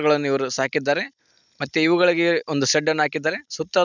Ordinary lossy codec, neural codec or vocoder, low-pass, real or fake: none; none; 7.2 kHz; real